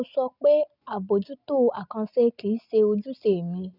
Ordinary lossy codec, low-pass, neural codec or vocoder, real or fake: none; 5.4 kHz; none; real